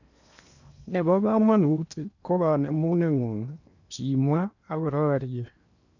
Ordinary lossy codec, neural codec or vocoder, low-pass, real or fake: none; codec, 16 kHz in and 24 kHz out, 0.8 kbps, FocalCodec, streaming, 65536 codes; 7.2 kHz; fake